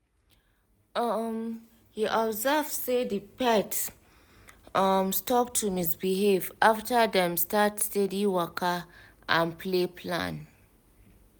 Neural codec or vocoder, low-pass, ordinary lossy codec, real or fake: none; none; none; real